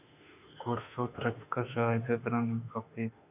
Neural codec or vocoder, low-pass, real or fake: autoencoder, 48 kHz, 32 numbers a frame, DAC-VAE, trained on Japanese speech; 3.6 kHz; fake